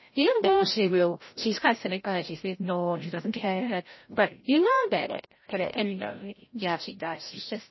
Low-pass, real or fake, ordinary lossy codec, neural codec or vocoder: 7.2 kHz; fake; MP3, 24 kbps; codec, 16 kHz, 0.5 kbps, FreqCodec, larger model